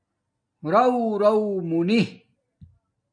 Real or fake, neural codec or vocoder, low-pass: real; none; 9.9 kHz